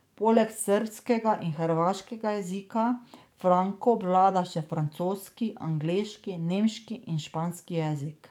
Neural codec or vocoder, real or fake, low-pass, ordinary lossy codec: codec, 44.1 kHz, 7.8 kbps, DAC; fake; 19.8 kHz; none